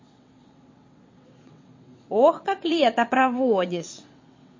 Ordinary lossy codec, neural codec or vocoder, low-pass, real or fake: MP3, 32 kbps; vocoder, 22.05 kHz, 80 mel bands, WaveNeXt; 7.2 kHz; fake